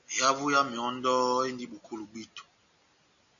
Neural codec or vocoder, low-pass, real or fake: none; 7.2 kHz; real